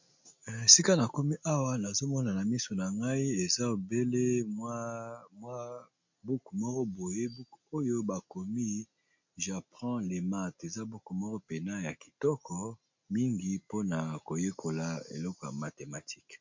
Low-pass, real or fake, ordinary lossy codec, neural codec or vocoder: 7.2 kHz; real; MP3, 48 kbps; none